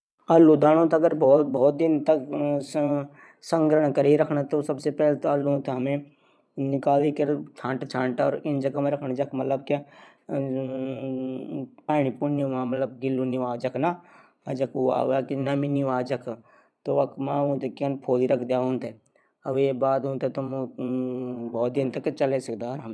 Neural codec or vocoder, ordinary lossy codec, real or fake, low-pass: vocoder, 22.05 kHz, 80 mel bands, Vocos; none; fake; none